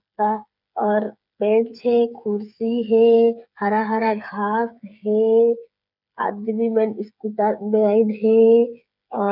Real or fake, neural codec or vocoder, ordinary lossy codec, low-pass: fake; codec, 16 kHz, 8 kbps, FreqCodec, smaller model; none; 5.4 kHz